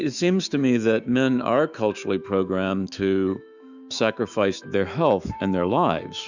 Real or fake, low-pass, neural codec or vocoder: fake; 7.2 kHz; autoencoder, 48 kHz, 128 numbers a frame, DAC-VAE, trained on Japanese speech